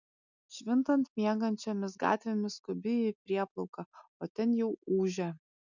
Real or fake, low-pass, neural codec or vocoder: real; 7.2 kHz; none